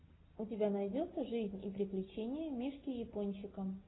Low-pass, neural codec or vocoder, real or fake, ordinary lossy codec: 7.2 kHz; none; real; AAC, 16 kbps